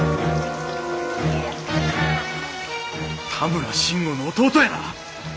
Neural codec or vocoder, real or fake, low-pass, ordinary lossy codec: none; real; none; none